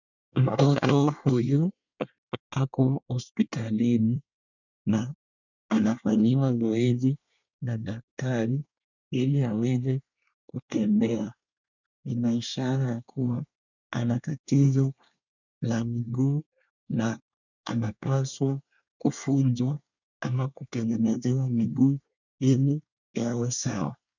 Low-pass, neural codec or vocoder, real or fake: 7.2 kHz; codec, 24 kHz, 1 kbps, SNAC; fake